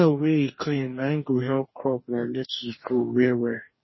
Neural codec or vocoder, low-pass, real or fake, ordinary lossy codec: codec, 44.1 kHz, 2.6 kbps, DAC; 7.2 kHz; fake; MP3, 24 kbps